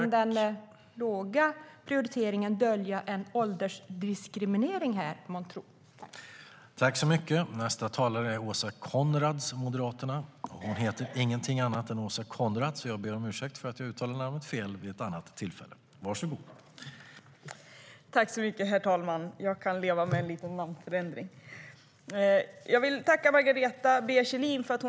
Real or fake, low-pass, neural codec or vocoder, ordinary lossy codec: real; none; none; none